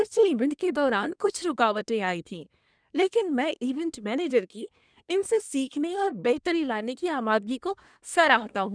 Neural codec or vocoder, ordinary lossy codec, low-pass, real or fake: codec, 24 kHz, 1 kbps, SNAC; none; 9.9 kHz; fake